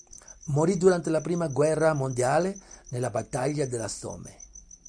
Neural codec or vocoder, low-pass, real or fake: none; 9.9 kHz; real